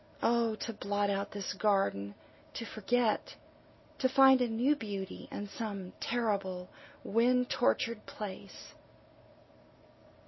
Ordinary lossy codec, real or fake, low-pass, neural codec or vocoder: MP3, 24 kbps; real; 7.2 kHz; none